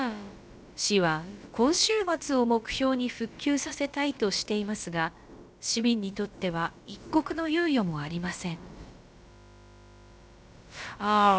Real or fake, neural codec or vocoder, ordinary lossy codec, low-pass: fake; codec, 16 kHz, about 1 kbps, DyCAST, with the encoder's durations; none; none